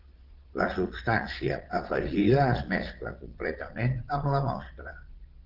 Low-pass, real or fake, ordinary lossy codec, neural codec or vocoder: 5.4 kHz; fake; Opus, 16 kbps; vocoder, 22.05 kHz, 80 mel bands, Vocos